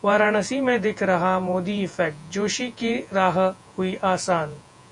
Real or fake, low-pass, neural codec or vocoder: fake; 10.8 kHz; vocoder, 48 kHz, 128 mel bands, Vocos